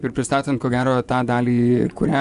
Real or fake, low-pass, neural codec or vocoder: fake; 10.8 kHz; vocoder, 24 kHz, 100 mel bands, Vocos